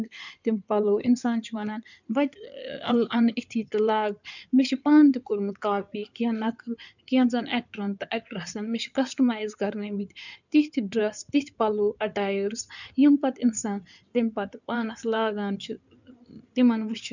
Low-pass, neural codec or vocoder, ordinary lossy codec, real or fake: 7.2 kHz; codec, 16 kHz in and 24 kHz out, 2.2 kbps, FireRedTTS-2 codec; none; fake